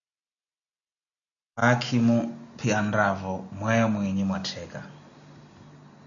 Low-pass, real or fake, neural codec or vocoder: 7.2 kHz; real; none